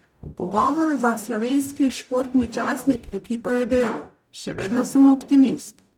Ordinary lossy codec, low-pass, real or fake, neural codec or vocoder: none; 19.8 kHz; fake; codec, 44.1 kHz, 0.9 kbps, DAC